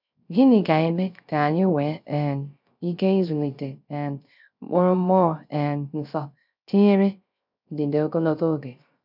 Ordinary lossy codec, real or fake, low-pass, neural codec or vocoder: none; fake; 5.4 kHz; codec, 16 kHz, 0.3 kbps, FocalCodec